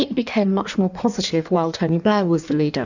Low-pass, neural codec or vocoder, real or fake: 7.2 kHz; codec, 16 kHz in and 24 kHz out, 1.1 kbps, FireRedTTS-2 codec; fake